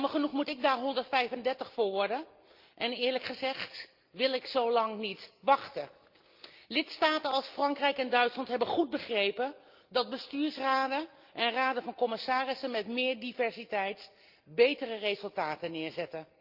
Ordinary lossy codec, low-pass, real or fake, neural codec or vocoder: Opus, 32 kbps; 5.4 kHz; real; none